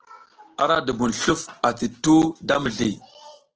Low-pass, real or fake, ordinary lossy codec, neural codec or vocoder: 7.2 kHz; real; Opus, 16 kbps; none